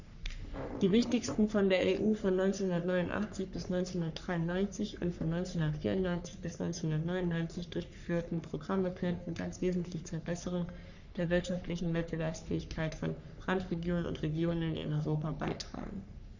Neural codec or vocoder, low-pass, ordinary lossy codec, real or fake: codec, 44.1 kHz, 3.4 kbps, Pupu-Codec; 7.2 kHz; none; fake